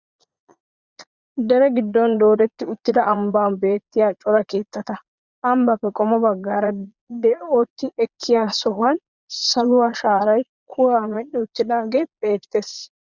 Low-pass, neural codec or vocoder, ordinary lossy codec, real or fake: 7.2 kHz; vocoder, 22.05 kHz, 80 mel bands, Vocos; Opus, 64 kbps; fake